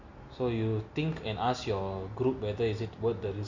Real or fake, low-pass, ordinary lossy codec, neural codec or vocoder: real; 7.2 kHz; MP3, 48 kbps; none